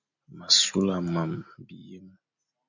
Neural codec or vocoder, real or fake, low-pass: none; real; 7.2 kHz